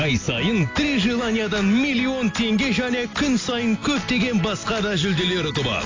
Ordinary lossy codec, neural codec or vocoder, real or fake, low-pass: AAC, 32 kbps; none; real; 7.2 kHz